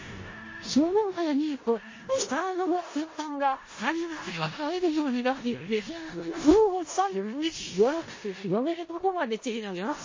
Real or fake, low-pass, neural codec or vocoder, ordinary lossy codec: fake; 7.2 kHz; codec, 16 kHz in and 24 kHz out, 0.4 kbps, LongCat-Audio-Codec, four codebook decoder; MP3, 32 kbps